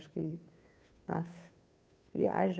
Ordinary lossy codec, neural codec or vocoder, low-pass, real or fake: none; codec, 16 kHz, 2 kbps, FunCodec, trained on Chinese and English, 25 frames a second; none; fake